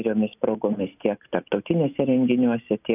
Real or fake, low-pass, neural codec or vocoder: real; 3.6 kHz; none